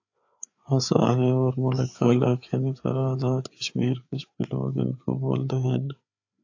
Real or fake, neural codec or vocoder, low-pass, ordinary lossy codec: fake; codec, 16 kHz, 4 kbps, FreqCodec, larger model; 7.2 kHz; AAC, 48 kbps